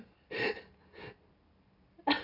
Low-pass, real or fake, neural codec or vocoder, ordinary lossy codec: 5.4 kHz; real; none; none